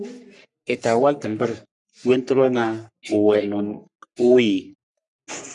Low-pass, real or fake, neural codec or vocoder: 10.8 kHz; fake; codec, 44.1 kHz, 3.4 kbps, Pupu-Codec